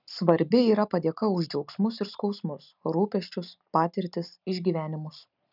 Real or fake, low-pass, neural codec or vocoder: real; 5.4 kHz; none